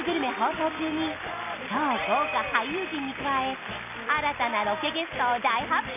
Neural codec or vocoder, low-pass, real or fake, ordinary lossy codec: none; 3.6 kHz; real; none